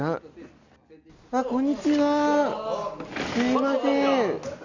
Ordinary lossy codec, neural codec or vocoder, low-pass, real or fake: Opus, 64 kbps; none; 7.2 kHz; real